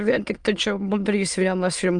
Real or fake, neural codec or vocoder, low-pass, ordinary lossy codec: fake; autoencoder, 22.05 kHz, a latent of 192 numbers a frame, VITS, trained on many speakers; 9.9 kHz; Opus, 32 kbps